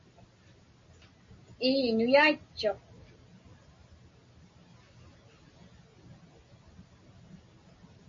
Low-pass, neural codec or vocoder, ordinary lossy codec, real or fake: 7.2 kHz; none; MP3, 32 kbps; real